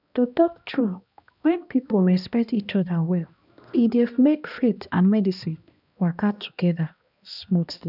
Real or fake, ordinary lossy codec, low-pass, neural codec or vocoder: fake; none; 5.4 kHz; codec, 16 kHz, 1 kbps, X-Codec, HuBERT features, trained on balanced general audio